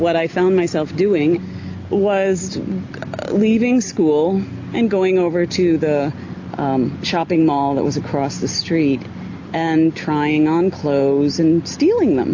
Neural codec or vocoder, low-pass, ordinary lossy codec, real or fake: none; 7.2 kHz; AAC, 48 kbps; real